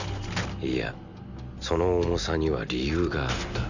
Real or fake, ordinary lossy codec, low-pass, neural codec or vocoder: real; none; 7.2 kHz; none